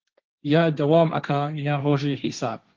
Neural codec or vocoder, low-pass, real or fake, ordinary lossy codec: codec, 16 kHz, 1.1 kbps, Voila-Tokenizer; 7.2 kHz; fake; Opus, 32 kbps